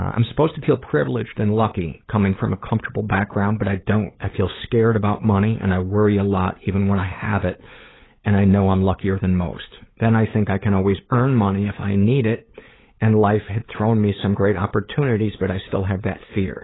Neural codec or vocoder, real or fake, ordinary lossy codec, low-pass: codec, 16 kHz, 8 kbps, FunCodec, trained on LibriTTS, 25 frames a second; fake; AAC, 16 kbps; 7.2 kHz